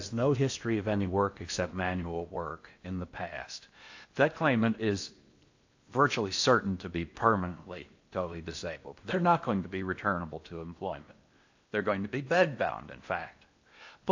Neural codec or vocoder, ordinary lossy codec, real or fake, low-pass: codec, 16 kHz in and 24 kHz out, 0.8 kbps, FocalCodec, streaming, 65536 codes; AAC, 48 kbps; fake; 7.2 kHz